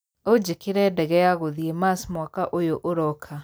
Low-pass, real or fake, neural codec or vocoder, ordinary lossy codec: none; real; none; none